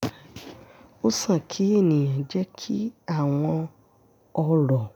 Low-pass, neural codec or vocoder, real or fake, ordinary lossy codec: 19.8 kHz; none; real; none